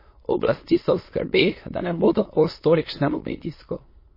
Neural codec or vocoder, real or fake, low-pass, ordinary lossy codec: autoencoder, 22.05 kHz, a latent of 192 numbers a frame, VITS, trained on many speakers; fake; 5.4 kHz; MP3, 24 kbps